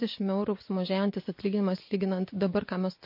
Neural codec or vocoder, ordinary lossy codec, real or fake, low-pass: vocoder, 24 kHz, 100 mel bands, Vocos; MP3, 32 kbps; fake; 5.4 kHz